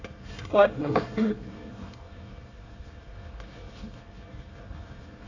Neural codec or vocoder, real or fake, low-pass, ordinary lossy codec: codec, 24 kHz, 1 kbps, SNAC; fake; 7.2 kHz; AAC, 48 kbps